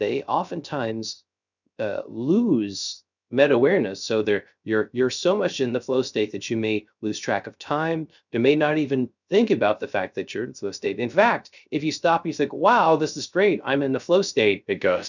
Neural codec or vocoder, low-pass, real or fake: codec, 16 kHz, 0.3 kbps, FocalCodec; 7.2 kHz; fake